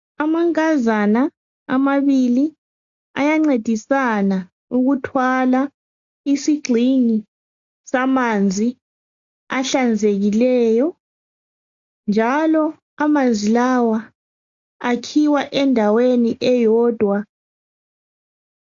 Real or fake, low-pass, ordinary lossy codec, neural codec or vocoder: real; 7.2 kHz; AAC, 64 kbps; none